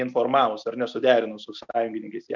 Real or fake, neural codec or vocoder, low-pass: real; none; 7.2 kHz